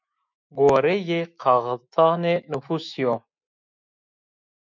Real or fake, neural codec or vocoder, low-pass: fake; autoencoder, 48 kHz, 128 numbers a frame, DAC-VAE, trained on Japanese speech; 7.2 kHz